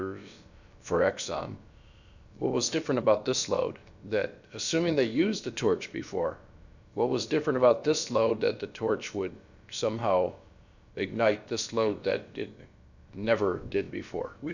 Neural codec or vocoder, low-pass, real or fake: codec, 16 kHz, about 1 kbps, DyCAST, with the encoder's durations; 7.2 kHz; fake